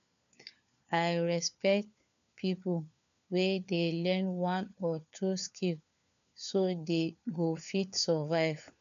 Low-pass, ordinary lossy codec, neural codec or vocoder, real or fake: 7.2 kHz; none; codec, 16 kHz, 4 kbps, FunCodec, trained on LibriTTS, 50 frames a second; fake